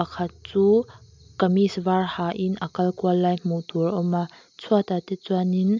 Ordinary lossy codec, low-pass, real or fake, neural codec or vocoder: MP3, 64 kbps; 7.2 kHz; real; none